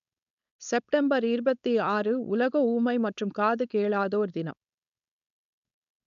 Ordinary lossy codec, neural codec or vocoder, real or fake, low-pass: none; codec, 16 kHz, 4.8 kbps, FACodec; fake; 7.2 kHz